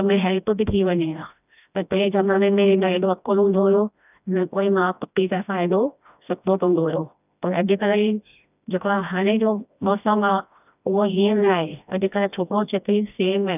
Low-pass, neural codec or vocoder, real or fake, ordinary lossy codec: 3.6 kHz; codec, 16 kHz, 1 kbps, FreqCodec, smaller model; fake; none